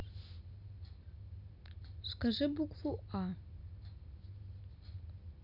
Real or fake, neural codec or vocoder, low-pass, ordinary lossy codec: real; none; 5.4 kHz; none